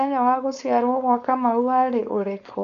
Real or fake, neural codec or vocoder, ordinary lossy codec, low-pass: fake; codec, 16 kHz, 4.8 kbps, FACodec; none; 7.2 kHz